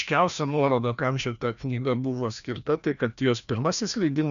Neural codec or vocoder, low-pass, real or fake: codec, 16 kHz, 1 kbps, FreqCodec, larger model; 7.2 kHz; fake